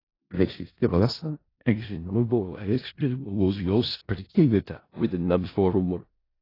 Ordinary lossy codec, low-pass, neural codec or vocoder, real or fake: AAC, 24 kbps; 5.4 kHz; codec, 16 kHz in and 24 kHz out, 0.4 kbps, LongCat-Audio-Codec, four codebook decoder; fake